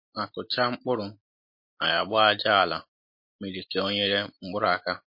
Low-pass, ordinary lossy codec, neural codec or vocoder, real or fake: 5.4 kHz; MP3, 32 kbps; none; real